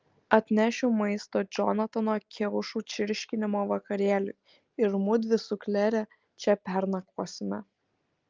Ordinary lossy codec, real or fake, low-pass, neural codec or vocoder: Opus, 32 kbps; real; 7.2 kHz; none